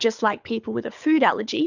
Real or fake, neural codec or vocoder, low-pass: fake; codec, 24 kHz, 6 kbps, HILCodec; 7.2 kHz